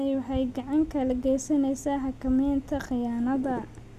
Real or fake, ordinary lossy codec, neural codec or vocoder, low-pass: real; none; none; 14.4 kHz